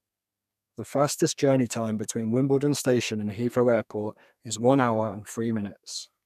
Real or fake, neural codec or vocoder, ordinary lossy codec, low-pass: fake; codec, 32 kHz, 1.9 kbps, SNAC; none; 14.4 kHz